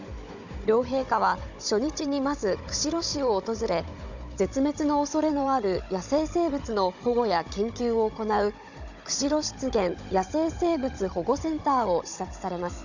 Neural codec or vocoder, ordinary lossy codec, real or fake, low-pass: codec, 16 kHz, 16 kbps, FunCodec, trained on Chinese and English, 50 frames a second; none; fake; 7.2 kHz